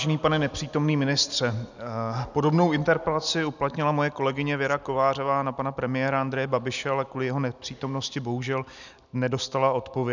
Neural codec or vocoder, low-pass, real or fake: none; 7.2 kHz; real